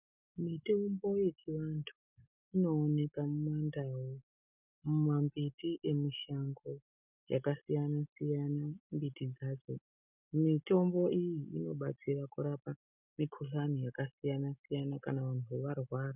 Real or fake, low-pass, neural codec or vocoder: real; 3.6 kHz; none